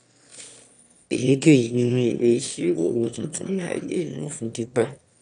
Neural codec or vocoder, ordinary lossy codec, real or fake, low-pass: autoencoder, 22.05 kHz, a latent of 192 numbers a frame, VITS, trained on one speaker; none; fake; 9.9 kHz